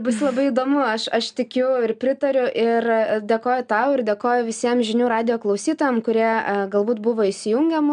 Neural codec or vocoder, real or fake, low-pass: none; real; 9.9 kHz